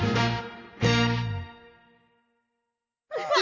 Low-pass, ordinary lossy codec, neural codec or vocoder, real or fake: 7.2 kHz; none; none; real